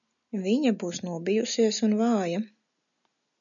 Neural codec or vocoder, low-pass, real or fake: none; 7.2 kHz; real